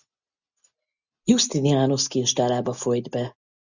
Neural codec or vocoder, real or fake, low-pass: none; real; 7.2 kHz